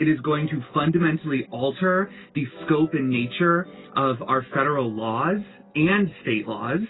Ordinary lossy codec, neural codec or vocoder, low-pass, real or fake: AAC, 16 kbps; none; 7.2 kHz; real